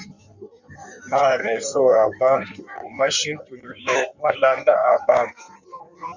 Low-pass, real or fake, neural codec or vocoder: 7.2 kHz; fake; codec, 16 kHz in and 24 kHz out, 1.1 kbps, FireRedTTS-2 codec